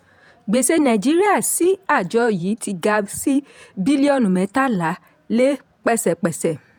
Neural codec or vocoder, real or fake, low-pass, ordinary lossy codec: vocoder, 48 kHz, 128 mel bands, Vocos; fake; none; none